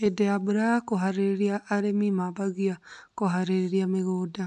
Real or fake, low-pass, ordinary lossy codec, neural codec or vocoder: real; 10.8 kHz; AAC, 96 kbps; none